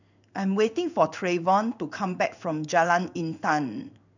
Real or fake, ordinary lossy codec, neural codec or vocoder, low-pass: fake; none; codec, 16 kHz in and 24 kHz out, 1 kbps, XY-Tokenizer; 7.2 kHz